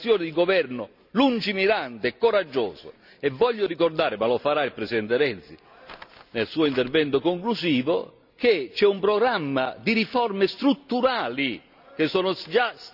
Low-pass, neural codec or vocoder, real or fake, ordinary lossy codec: 5.4 kHz; none; real; none